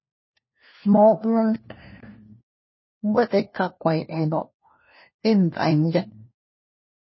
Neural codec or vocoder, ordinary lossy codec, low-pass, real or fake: codec, 16 kHz, 1 kbps, FunCodec, trained on LibriTTS, 50 frames a second; MP3, 24 kbps; 7.2 kHz; fake